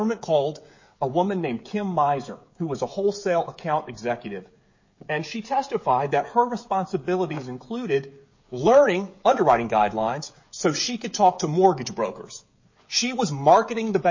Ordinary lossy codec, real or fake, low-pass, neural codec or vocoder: MP3, 32 kbps; fake; 7.2 kHz; codec, 16 kHz, 16 kbps, FreqCodec, smaller model